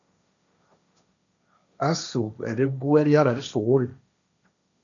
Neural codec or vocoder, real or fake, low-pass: codec, 16 kHz, 1.1 kbps, Voila-Tokenizer; fake; 7.2 kHz